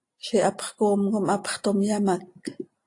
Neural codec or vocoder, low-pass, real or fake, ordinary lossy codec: none; 10.8 kHz; real; AAC, 48 kbps